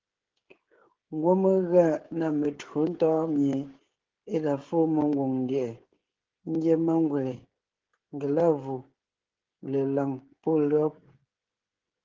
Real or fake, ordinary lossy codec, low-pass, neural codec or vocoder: fake; Opus, 16 kbps; 7.2 kHz; codec, 16 kHz, 16 kbps, FreqCodec, smaller model